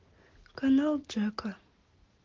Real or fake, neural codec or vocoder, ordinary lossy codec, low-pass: real; none; Opus, 16 kbps; 7.2 kHz